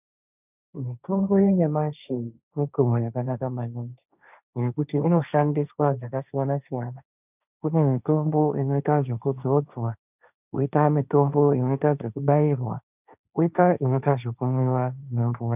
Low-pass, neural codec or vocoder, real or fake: 3.6 kHz; codec, 16 kHz, 1.1 kbps, Voila-Tokenizer; fake